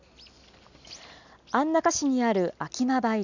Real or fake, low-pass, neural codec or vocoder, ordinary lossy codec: real; 7.2 kHz; none; none